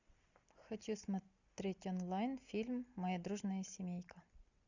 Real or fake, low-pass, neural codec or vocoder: real; 7.2 kHz; none